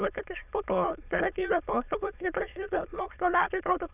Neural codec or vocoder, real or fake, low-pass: autoencoder, 22.05 kHz, a latent of 192 numbers a frame, VITS, trained on many speakers; fake; 3.6 kHz